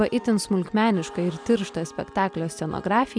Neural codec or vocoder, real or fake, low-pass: none; real; 9.9 kHz